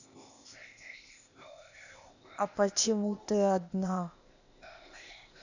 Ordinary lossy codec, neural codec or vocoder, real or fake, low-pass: none; codec, 16 kHz, 0.8 kbps, ZipCodec; fake; 7.2 kHz